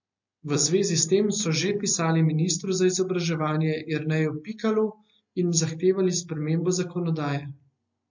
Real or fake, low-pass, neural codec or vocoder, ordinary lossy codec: real; 7.2 kHz; none; MP3, 48 kbps